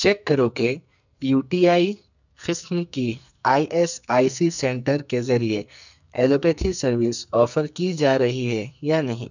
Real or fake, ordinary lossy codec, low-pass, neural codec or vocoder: fake; none; 7.2 kHz; codec, 44.1 kHz, 2.6 kbps, SNAC